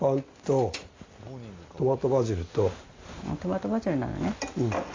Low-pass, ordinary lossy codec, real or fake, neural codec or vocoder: 7.2 kHz; AAC, 32 kbps; real; none